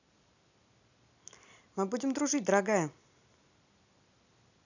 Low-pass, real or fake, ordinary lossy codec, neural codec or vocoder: 7.2 kHz; real; none; none